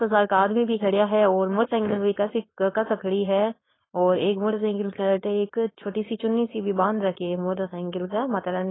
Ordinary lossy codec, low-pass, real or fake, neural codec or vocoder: AAC, 16 kbps; 7.2 kHz; fake; codec, 16 kHz, 4.8 kbps, FACodec